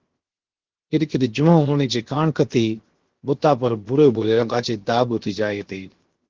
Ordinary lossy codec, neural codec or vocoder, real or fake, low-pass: Opus, 16 kbps; codec, 16 kHz, 0.7 kbps, FocalCodec; fake; 7.2 kHz